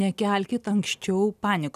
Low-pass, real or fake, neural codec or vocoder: 14.4 kHz; real; none